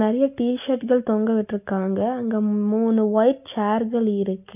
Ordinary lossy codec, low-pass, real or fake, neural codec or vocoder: none; 3.6 kHz; real; none